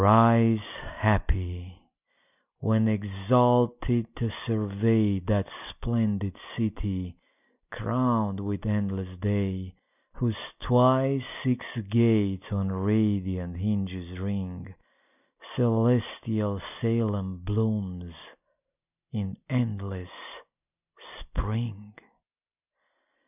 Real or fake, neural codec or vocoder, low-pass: real; none; 3.6 kHz